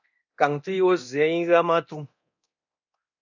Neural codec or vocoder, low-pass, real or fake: codec, 24 kHz, 0.9 kbps, DualCodec; 7.2 kHz; fake